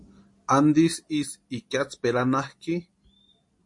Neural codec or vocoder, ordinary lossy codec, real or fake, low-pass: none; MP3, 48 kbps; real; 10.8 kHz